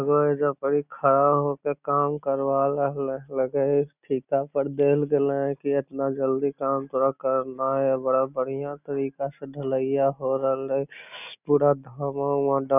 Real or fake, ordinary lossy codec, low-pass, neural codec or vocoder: real; none; 3.6 kHz; none